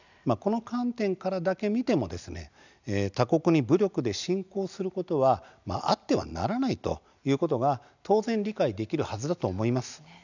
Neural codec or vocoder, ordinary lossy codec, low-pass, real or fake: none; none; 7.2 kHz; real